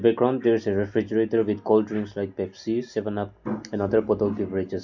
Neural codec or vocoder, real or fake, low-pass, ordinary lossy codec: none; real; 7.2 kHz; none